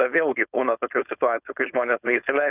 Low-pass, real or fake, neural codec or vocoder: 3.6 kHz; fake; codec, 16 kHz, 4.8 kbps, FACodec